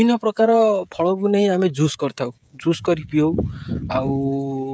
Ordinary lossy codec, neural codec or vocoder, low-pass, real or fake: none; codec, 16 kHz, 16 kbps, FreqCodec, smaller model; none; fake